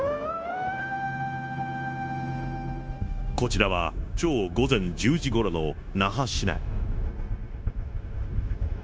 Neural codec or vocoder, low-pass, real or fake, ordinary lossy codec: codec, 16 kHz, 0.9 kbps, LongCat-Audio-Codec; none; fake; none